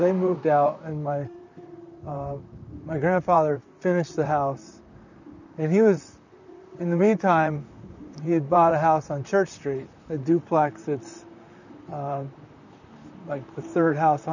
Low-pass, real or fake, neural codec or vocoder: 7.2 kHz; fake; vocoder, 44.1 kHz, 128 mel bands, Pupu-Vocoder